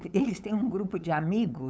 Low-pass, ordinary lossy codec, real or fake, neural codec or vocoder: none; none; fake; codec, 16 kHz, 8 kbps, FunCodec, trained on LibriTTS, 25 frames a second